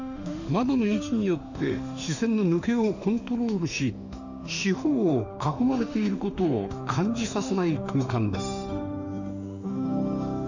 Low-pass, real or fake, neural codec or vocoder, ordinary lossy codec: 7.2 kHz; fake; autoencoder, 48 kHz, 32 numbers a frame, DAC-VAE, trained on Japanese speech; none